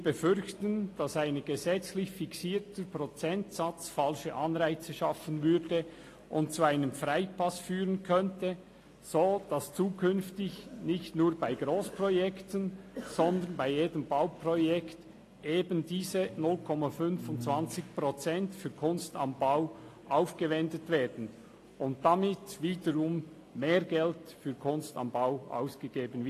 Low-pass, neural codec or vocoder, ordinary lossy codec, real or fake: 14.4 kHz; none; AAC, 48 kbps; real